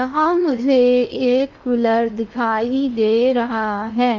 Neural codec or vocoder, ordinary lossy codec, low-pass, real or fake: codec, 16 kHz in and 24 kHz out, 0.8 kbps, FocalCodec, streaming, 65536 codes; none; 7.2 kHz; fake